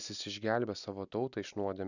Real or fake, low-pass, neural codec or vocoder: real; 7.2 kHz; none